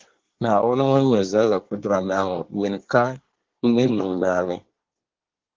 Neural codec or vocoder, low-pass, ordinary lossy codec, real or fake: codec, 24 kHz, 1 kbps, SNAC; 7.2 kHz; Opus, 16 kbps; fake